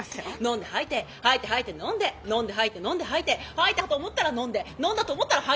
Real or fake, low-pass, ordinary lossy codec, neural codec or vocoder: real; none; none; none